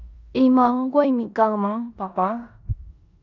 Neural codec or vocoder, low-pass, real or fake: codec, 16 kHz in and 24 kHz out, 0.9 kbps, LongCat-Audio-Codec, four codebook decoder; 7.2 kHz; fake